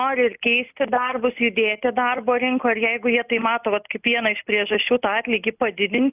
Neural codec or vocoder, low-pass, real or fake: none; 3.6 kHz; real